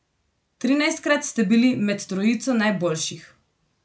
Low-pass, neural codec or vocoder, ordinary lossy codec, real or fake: none; none; none; real